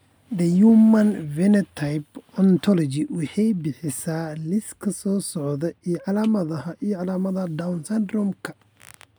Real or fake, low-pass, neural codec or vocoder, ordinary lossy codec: real; none; none; none